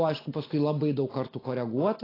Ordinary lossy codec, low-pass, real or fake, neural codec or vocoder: AAC, 24 kbps; 5.4 kHz; real; none